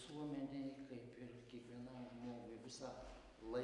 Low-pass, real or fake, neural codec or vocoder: 10.8 kHz; real; none